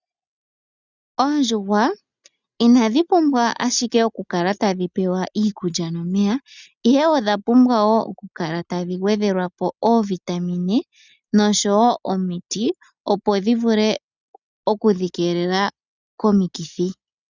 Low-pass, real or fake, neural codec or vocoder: 7.2 kHz; real; none